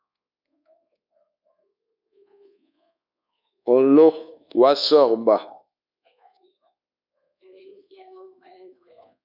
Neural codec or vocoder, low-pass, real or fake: codec, 24 kHz, 1.2 kbps, DualCodec; 5.4 kHz; fake